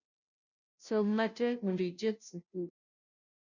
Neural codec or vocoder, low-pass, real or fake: codec, 16 kHz, 0.5 kbps, FunCodec, trained on Chinese and English, 25 frames a second; 7.2 kHz; fake